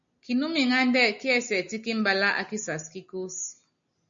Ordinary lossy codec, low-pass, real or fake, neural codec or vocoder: MP3, 64 kbps; 7.2 kHz; real; none